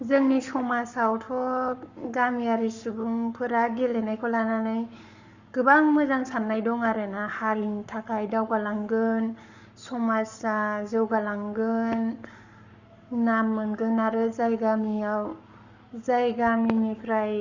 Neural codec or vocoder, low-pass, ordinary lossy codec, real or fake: codec, 44.1 kHz, 7.8 kbps, Pupu-Codec; 7.2 kHz; Opus, 64 kbps; fake